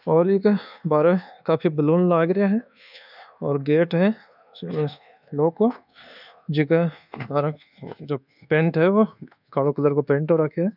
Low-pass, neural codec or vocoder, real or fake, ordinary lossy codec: 5.4 kHz; codec, 24 kHz, 1.2 kbps, DualCodec; fake; none